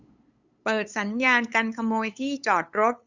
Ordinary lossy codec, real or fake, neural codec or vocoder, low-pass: Opus, 64 kbps; fake; codec, 16 kHz, 8 kbps, FunCodec, trained on LibriTTS, 25 frames a second; 7.2 kHz